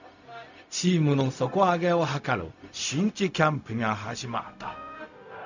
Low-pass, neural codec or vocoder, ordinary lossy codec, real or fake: 7.2 kHz; codec, 16 kHz, 0.4 kbps, LongCat-Audio-Codec; none; fake